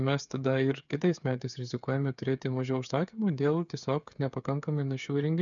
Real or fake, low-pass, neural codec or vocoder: fake; 7.2 kHz; codec, 16 kHz, 8 kbps, FreqCodec, smaller model